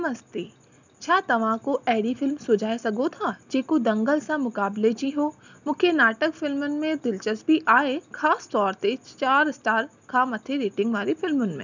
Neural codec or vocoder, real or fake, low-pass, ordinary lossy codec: none; real; 7.2 kHz; none